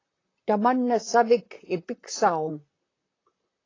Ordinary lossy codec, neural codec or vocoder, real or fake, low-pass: AAC, 32 kbps; vocoder, 44.1 kHz, 128 mel bands, Pupu-Vocoder; fake; 7.2 kHz